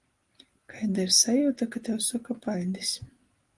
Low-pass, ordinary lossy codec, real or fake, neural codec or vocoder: 10.8 kHz; Opus, 24 kbps; real; none